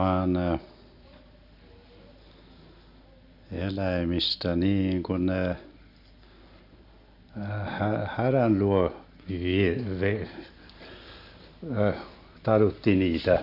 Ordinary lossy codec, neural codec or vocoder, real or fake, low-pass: none; none; real; 5.4 kHz